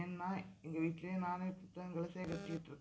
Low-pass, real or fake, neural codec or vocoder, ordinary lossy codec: none; real; none; none